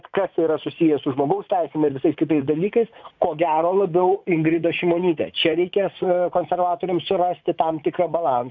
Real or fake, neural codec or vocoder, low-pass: fake; vocoder, 44.1 kHz, 80 mel bands, Vocos; 7.2 kHz